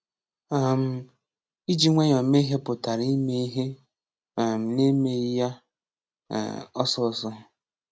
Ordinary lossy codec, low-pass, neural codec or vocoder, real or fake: none; none; none; real